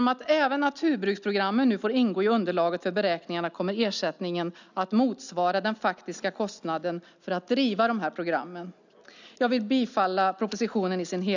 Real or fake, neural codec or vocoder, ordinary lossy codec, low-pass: real; none; none; 7.2 kHz